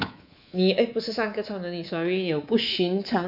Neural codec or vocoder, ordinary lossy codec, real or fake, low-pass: codec, 24 kHz, 3.1 kbps, DualCodec; none; fake; 5.4 kHz